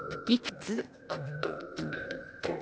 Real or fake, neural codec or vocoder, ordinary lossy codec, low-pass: fake; codec, 16 kHz, 0.8 kbps, ZipCodec; none; none